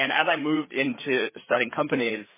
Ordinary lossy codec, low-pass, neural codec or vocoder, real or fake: MP3, 16 kbps; 3.6 kHz; codec, 16 kHz, 4 kbps, FreqCodec, larger model; fake